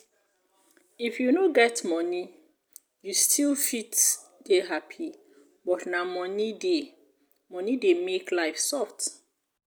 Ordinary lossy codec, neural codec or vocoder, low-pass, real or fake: none; none; none; real